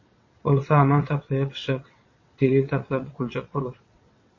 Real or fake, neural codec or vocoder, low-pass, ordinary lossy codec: fake; vocoder, 22.05 kHz, 80 mel bands, WaveNeXt; 7.2 kHz; MP3, 32 kbps